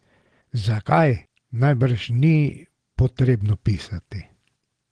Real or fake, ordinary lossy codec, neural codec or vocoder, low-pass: real; Opus, 16 kbps; none; 9.9 kHz